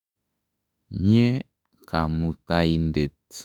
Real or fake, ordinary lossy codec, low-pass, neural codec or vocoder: fake; none; 19.8 kHz; autoencoder, 48 kHz, 32 numbers a frame, DAC-VAE, trained on Japanese speech